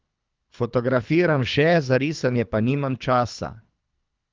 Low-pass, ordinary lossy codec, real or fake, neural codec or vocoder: 7.2 kHz; Opus, 24 kbps; fake; codec, 24 kHz, 3 kbps, HILCodec